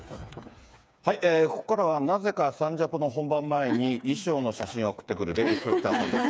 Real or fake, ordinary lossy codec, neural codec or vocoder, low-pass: fake; none; codec, 16 kHz, 4 kbps, FreqCodec, smaller model; none